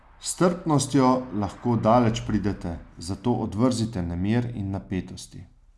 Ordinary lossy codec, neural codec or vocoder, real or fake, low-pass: none; none; real; none